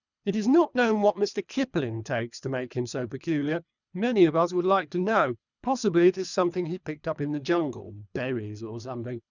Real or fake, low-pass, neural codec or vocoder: fake; 7.2 kHz; codec, 24 kHz, 3 kbps, HILCodec